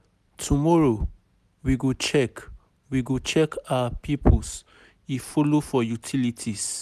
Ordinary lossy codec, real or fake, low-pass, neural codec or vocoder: none; real; 14.4 kHz; none